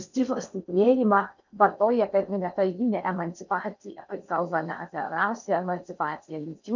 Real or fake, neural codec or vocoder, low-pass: fake; codec, 16 kHz in and 24 kHz out, 0.6 kbps, FocalCodec, streaming, 4096 codes; 7.2 kHz